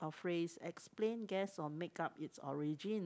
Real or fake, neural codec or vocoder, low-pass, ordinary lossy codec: real; none; none; none